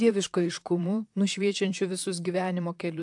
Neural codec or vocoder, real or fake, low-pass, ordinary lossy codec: vocoder, 44.1 kHz, 128 mel bands, Pupu-Vocoder; fake; 10.8 kHz; AAC, 64 kbps